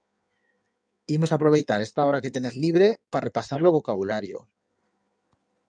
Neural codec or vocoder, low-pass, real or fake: codec, 16 kHz in and 24 kHz out, 1.1 kbps, FireRedTTS-2 codec; 9.9 kHz; fake